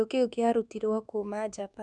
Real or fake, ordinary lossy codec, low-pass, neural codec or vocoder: fake; none; 10.8 kHz; codec, 24 kHz, 1.2 kbps, DualCodec